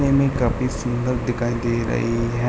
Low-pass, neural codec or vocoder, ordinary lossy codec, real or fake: none; none; none; real